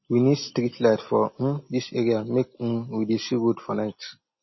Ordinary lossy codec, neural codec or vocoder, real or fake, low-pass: MP3, 24 kbps; none; real; 7.2 kHz